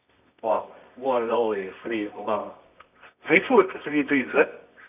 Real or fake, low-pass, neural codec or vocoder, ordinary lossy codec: fake; 3.6 kHz; codec, 24 kHz, 0.9 kbps, WavTokenizer, medium music audio release; none